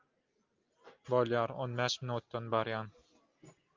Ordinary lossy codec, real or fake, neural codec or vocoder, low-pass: Opus, 24 kbps; real; none; 7.2 kHz